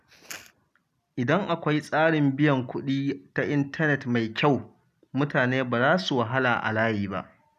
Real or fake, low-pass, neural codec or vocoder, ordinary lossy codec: real; 14.4 kHz; none; none